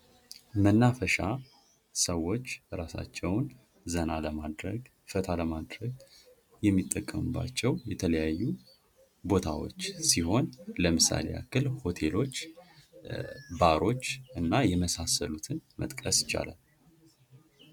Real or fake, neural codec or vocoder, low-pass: real; none; 19.8 kHz